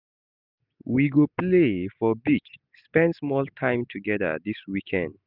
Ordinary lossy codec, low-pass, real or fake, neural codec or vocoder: none; 5.4 kHz; real; none